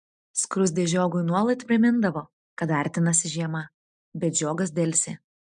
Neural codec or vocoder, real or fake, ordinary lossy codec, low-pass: none; real; MP3, 96 kbps; 9.9 kHz